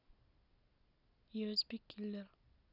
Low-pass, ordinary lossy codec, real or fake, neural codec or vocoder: 5.4 kHz; none; real; none